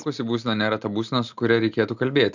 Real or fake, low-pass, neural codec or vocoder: real; 7.2 kHz; none